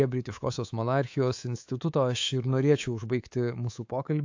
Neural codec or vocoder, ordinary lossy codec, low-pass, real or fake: codec, 24 kHz, 3.1 kbps, DualCodec; AAC, 48 kbps; 7.2 kHz; fake